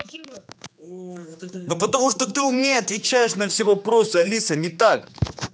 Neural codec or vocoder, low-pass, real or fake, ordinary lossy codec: codec, 16 kHz, 2 kbps, X-Codec, HuBERT features, trained on general audio; none; fake; none